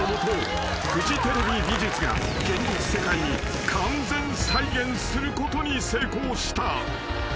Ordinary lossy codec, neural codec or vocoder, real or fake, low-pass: none; none; real; none